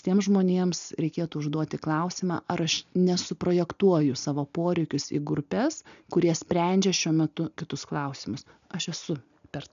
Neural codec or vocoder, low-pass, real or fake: none; 7.2 kHz; real